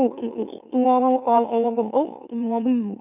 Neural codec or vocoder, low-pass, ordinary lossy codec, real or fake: autoencoder, 44.1 kHz, a latent of 192 numbers a frame, MeloTTS; 3.6 kHz; none; fake